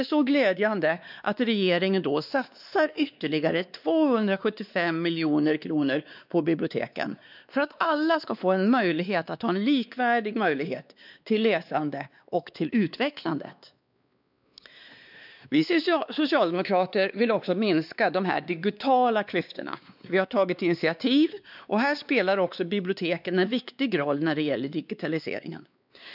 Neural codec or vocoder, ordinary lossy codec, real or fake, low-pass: codec, 16 kHz, 2 kbps, X-Codec, WavLM features, trained on Multilingual LibriSpeech; none; fake; 5.4 kHz